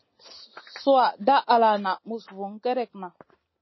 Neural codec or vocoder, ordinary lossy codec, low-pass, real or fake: none; MP3, 24 kbps; 7.2 kHz; real